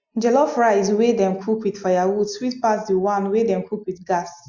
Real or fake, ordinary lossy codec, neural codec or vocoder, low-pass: real; MP3, 64 kbps; none; 7.2 kHz